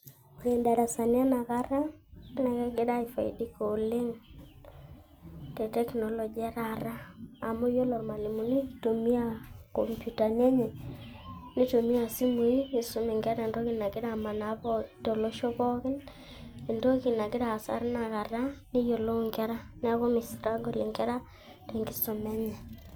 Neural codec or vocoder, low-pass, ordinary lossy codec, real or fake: none; none; none; real